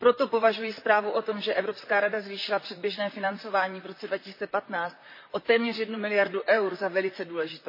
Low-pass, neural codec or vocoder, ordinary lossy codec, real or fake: 5.4 kHz; vocoder, 44.1 kHz, 128 mel bands, Pupu-Vocoder; MP3, 24 kbps; fake